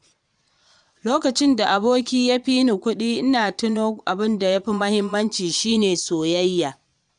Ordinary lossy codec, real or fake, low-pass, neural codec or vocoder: none; fake; 9.9 kHz; vocoder, 22.05 kHz, 80 mel bands, Vocos